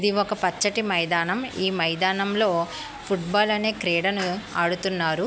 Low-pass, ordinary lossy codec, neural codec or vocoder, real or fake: none; none; none; real